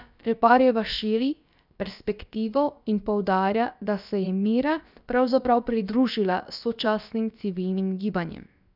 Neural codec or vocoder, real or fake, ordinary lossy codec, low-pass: codec, 16 kHz, about 1 kbps, DyCAST, with the encoder's durations; fake; none; 5.4 kHz